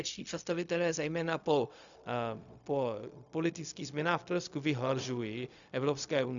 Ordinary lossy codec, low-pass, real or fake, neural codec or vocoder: MP3, 96 kbps; 7.2 kHz; fake; codec, 16 kHz, 0.4 kbps, LongCat-Audio-Codec